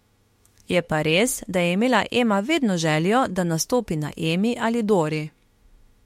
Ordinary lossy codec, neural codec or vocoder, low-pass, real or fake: MP3, 64 kbps; autoencoder, 48 kHz, 32 numbers a frame, DAC-VAE, trained on Japanese speech; 19.8 kHz; fake